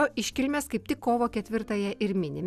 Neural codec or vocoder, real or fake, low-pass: none; real; 14.4 kHz